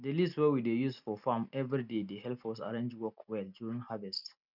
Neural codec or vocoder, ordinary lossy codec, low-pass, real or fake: none; none; 5.4 kHz; real